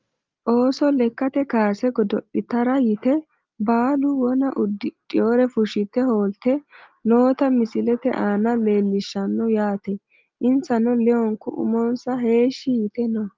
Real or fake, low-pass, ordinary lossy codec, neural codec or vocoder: real; 7.2 kHz; Opus, 32 kbps; none